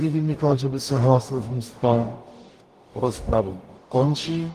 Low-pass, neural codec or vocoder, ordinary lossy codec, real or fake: 14.4 kHz; codec, 44.1 kHz, 0.9 kbps, DAC; Opus, 24 kbps; fake